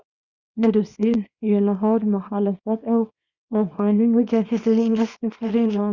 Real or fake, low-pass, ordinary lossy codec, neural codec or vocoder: fake; 7.2 kHz; Opus, 64 kbps; codec, 24 kHz, 0.9 kbps, WavTokenizer, small release